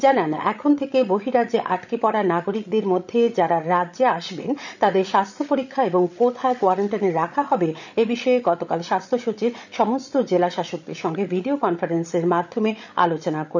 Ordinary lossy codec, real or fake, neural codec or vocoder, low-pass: none; fake; codec, 16 kHz, 16 kbps, FreqCodec, larger model; 7.2 kHz